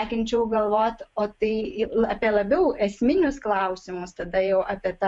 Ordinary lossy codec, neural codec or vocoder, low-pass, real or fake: MP3, 64 kbps; vocoder, 48 kHz, 128 mel bands, Vocos; 10.8 kHz; fake